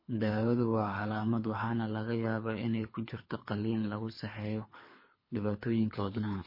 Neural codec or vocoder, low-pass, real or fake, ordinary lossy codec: codec, 24 kHz, 3 kbps, HILCodec; 5.4 kHz; fake; MP3, 24 kbps